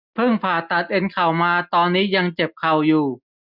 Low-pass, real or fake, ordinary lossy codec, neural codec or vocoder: 5.4 kHz; real; none; none